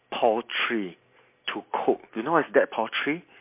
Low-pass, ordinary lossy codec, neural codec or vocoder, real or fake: 3.6 kHz; MP3, 24 kbps; none; real